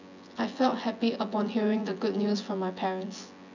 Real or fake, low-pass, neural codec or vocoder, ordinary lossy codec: fake; 7.2 kHz; vocoder, 24 kHz, 100 mel bands, Vocos; none